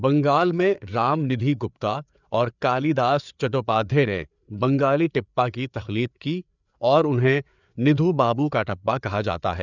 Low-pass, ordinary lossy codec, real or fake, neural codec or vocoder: 7.2 kHz; none; fake; codec, 16 kHz, 4 kbps, FreqCodec, larger model